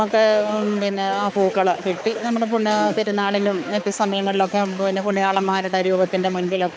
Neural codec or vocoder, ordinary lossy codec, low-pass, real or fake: codec, 16 kHz, 4 kbps, X-Codec, HuBERT features, trained on balanced general audio; none; none; fake